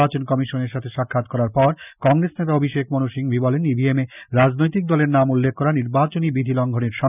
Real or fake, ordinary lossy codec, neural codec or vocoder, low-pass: real; none; none; 3.6 kHz